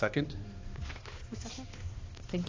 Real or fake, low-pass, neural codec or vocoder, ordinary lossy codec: fake; 7.2 kHz; codec, 16 kHz, 2 kbps, X-Codec, HuBERT features, trained on balanced general audio; AAC, 32 kbps